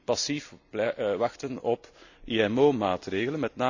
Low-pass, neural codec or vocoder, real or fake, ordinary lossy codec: 7.2 kHz; none; real; none